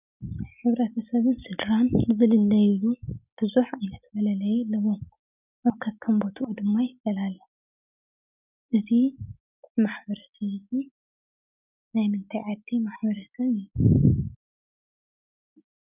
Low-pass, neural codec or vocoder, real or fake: 3.6 kHz; none; real